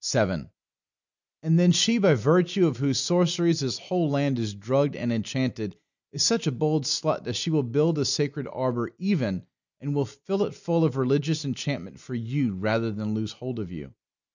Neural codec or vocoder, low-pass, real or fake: none; 7.2 kHz; real